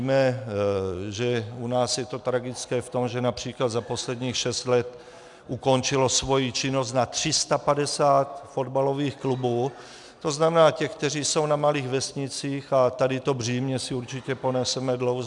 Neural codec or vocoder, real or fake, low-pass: none; real; 10.8 kHz